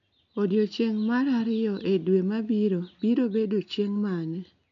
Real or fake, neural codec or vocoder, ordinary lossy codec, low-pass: real; none; MP3, 64 kbps; 7.2 kHz